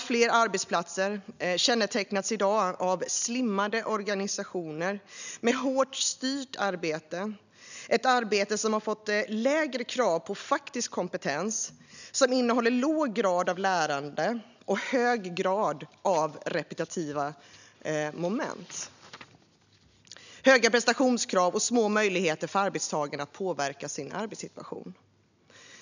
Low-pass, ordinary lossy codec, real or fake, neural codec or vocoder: 7.2 kHz; none; real; none